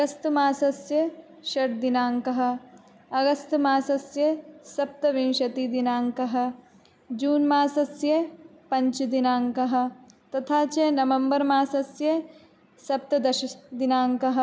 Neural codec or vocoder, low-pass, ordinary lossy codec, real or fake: none; none; none; real